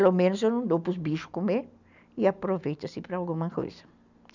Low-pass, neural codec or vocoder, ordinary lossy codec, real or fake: 7.2 kHz; none; none; real